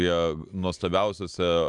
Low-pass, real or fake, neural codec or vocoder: 10.8 kHz; real; none